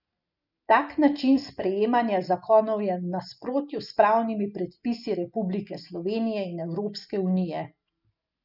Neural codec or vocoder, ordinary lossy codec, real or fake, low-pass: none; none; real; 5.4 kHz